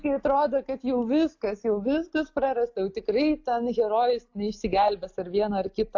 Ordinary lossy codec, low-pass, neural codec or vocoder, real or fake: AAC, 48 kbps; 7.2 kHz; none; real